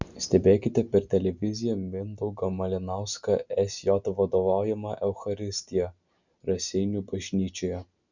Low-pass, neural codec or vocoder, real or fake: 7.2 kHz; none; real